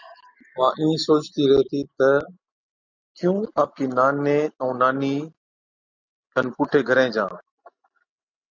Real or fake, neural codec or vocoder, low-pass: real; none; 7.2 kHz